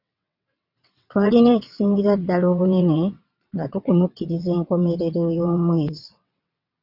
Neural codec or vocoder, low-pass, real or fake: vocoder, 22.05 kHz, 80 mel bands, WaveNeXt; 5.4 kHz; fake